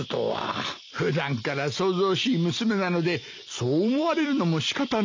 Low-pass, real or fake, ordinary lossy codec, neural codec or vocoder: 7.2 kHz; real; MP3, 64 kbps; none